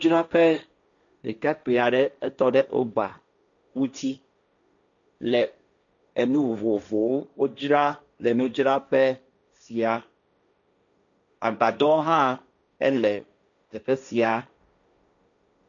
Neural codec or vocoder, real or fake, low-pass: codec, 16 kHz, 1.1 kbps, Voila-Tokenizer; fake; 7.2 kHz